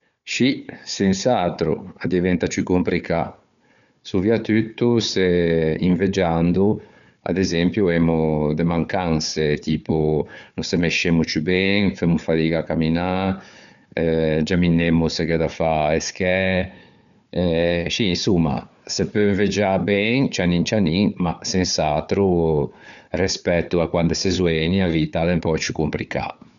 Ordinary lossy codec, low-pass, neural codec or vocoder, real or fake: none; 7.2 kHz; codec, 16 kHz, 4 kbps, FunCodec, trained on Chinese and English, 50 frames a second; fake